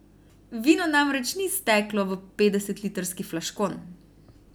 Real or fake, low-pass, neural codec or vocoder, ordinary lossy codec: real; none; none; none